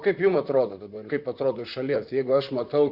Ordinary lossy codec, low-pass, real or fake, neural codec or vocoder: Opus, 64 kbps; 5.4 kHz; fake; vocoder, 44.1 kHz, 128 mel bands, Pupu-Vocoder